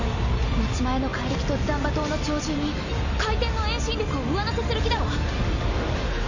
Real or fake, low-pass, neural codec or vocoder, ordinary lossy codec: real; 7.2 kHz; none; none